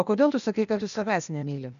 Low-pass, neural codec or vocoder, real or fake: 7.2 kHz; codec, 16 kHz, 0.8 kbps, ZipCodec; fake